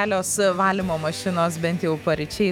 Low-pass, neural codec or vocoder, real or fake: 19.8 kHz; autoencoder, 48 kHz, 128 numbers a frame, DAC-VAE, trained on Japanese speech; fake